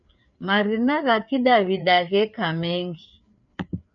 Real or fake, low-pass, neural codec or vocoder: fake; 7.2 kHz; codec, 16 kHz, 4 kbps, FreqCodec, larger model